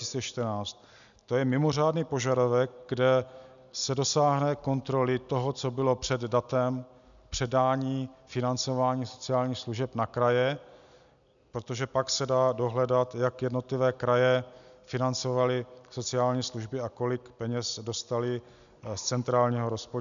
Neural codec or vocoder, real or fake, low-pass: none; real; 7.2 kHz